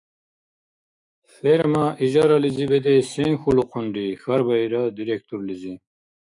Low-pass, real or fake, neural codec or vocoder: 10.8 kHz; fake; autoencoder, 48 kHz, 128 numbers a frame, DAC-VAE, trained on Japanese speech